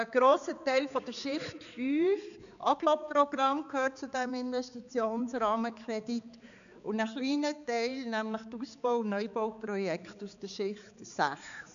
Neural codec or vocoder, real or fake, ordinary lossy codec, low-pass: codec, 16 kHz, 4 kbps, X-Codec, HuBERT features, trained on balanced general audio; fake; none; 7.2 kHz